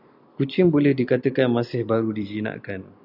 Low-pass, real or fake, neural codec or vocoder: 5.4 kHz; real; none